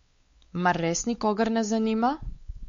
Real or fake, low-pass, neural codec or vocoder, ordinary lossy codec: fake; 7.2 kHz; codec, 16 kHz, 4 kbps, X-Codec, WavLM features, trained on Multilingual LibriSpeech; MP3, 48 kbps